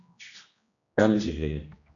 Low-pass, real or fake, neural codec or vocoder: 7.2 kHz; fake; codec, 16 kHz, 1 kbps, X-Codec, HuBERT features, trained on general audio